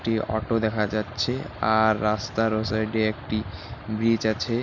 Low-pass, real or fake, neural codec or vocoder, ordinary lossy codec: 7.2 kHz; real; none; none